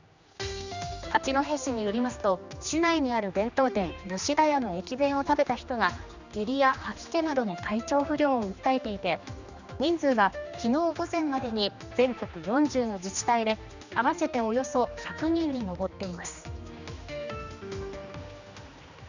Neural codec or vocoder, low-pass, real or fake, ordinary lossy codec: codec, 16 kHz, 2 kbps, X-Codec, HuBERT features, trained on general audio; 7.2 kHz; fake; none